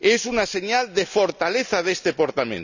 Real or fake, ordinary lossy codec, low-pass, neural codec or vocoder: real; none; 7.2 kHz; none